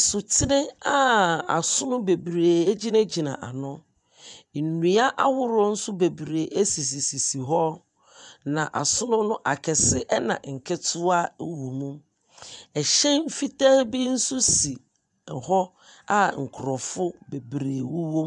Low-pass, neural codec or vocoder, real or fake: 10.8 kHz; vocoder, 24 kHz, 100 mel bands, Vocos; fake